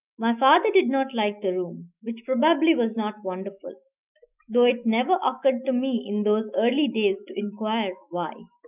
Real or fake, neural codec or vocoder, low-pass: fake; autoencoder, 48 kHz, 128 numbers a frame, DAC-VAE, trained on Japanese speech; 3.6 kHz